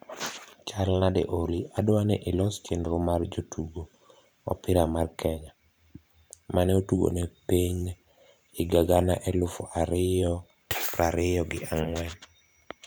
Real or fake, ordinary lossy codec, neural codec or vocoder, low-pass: fake; none; vocoder, 44.1 kHz, 128 mel bands every 512 samples, BigVGAN v2; none